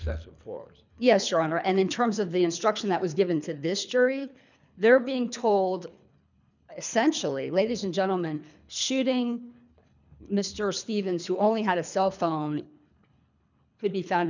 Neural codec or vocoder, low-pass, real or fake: codec, 24 kHz, 3 kbps, HILCodec; 7.2 kHz; fake